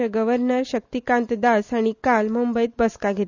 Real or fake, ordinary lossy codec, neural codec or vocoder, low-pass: real; none; none; 7.2 kHz